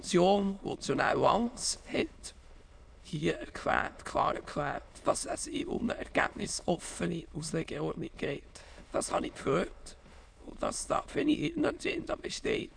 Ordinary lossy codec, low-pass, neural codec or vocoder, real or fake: MP3, 96 kbps; 9.9 kHz; autoencoder, 22.05 kHz, a latent of 192 numbers a frame, VITS, trained on many speakers; fake